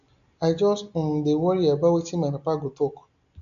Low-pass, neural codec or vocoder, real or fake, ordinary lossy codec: 7.2 kHz; none; real; none